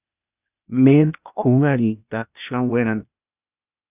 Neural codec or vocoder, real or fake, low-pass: codec, 16 kHz, 0.8 kbps, ZipCodec; fake; 3.6 kHz